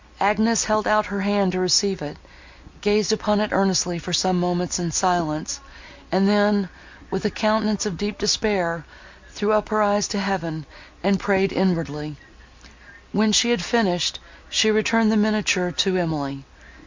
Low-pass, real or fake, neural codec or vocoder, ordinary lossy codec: 7.2 kHz; real; none; MP3, 64 kbps